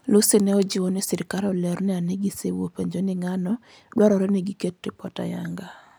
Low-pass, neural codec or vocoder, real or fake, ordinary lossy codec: none; none; real; none